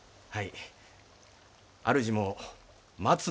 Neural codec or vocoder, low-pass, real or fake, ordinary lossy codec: none; none; real; none